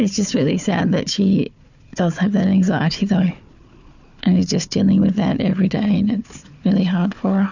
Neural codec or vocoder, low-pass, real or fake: codec, 16 kHz, 4 kbps, FunCodec, trained on Chinese and English, 50 frames a second; 7.2 kHz; fake